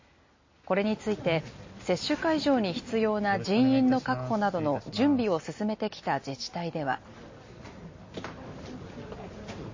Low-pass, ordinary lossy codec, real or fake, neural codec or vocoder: 7.2 kHz; MP3, 32 kbps; real; none